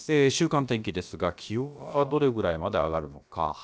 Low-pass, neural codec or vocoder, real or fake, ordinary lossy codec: none; codec, 16 kHz, about 1 kbps, DyCAST, with the encoder's durations; fake; none